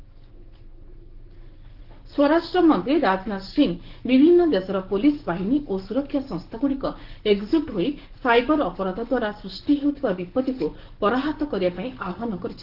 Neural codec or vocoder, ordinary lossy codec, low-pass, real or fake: codec, 44.1 kHz, 7.8 kbps, DAC; Opus, 16 kbps; 5.4 kHz; fake